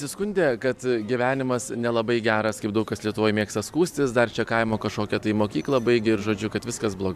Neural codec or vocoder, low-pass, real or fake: vocoder, 44.1 kHz, 128 mel bands every 512 samples, BigVGAN v2; 14.4 kHz; fake